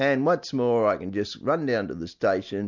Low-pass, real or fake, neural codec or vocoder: 7.2 kHz; real; none